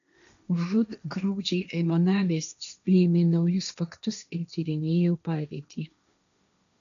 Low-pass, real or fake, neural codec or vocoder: 7.2 kHz; fake; codec, 16 kHz, 1.1 kbps, Voila-Tokenizer